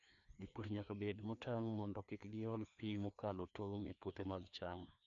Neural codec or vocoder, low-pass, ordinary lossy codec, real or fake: codec, 16 kHz, 2 kbps, FreqCodec, larger model; 7.2 kHz; none; fake